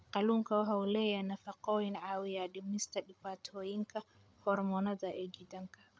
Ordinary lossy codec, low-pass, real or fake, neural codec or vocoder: none; none; fake; codec, 16 kHz, 8 kbps, FreqCodec, larger model